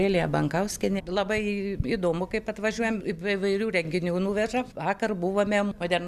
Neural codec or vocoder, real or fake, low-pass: none; real; 14.4 kHz